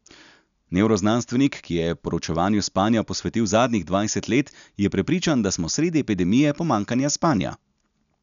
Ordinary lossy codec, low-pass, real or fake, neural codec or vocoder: none; 7.2 kHz; real; none